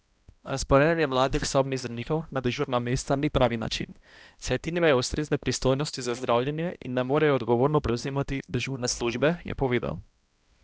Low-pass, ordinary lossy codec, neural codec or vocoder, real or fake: none; none; codec, 16 kHz, 1 kbps, X-Codec, HuBERT features, trained on balanced general audio; fake